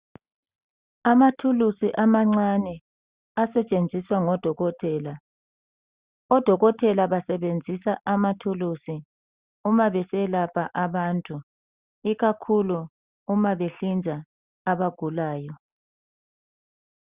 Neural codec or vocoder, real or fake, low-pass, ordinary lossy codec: none; real; 3.6 kHz; Opus, 64 kbps